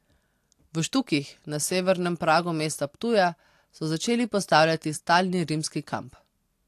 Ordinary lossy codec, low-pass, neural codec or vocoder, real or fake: AAC, 64 kbps; 14.4 kHz; none; real